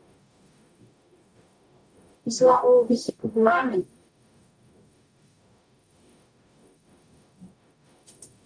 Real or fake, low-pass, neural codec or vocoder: fake; 9.9 kHz; codec, 44.1 kHz, 0.9 kbps, DAC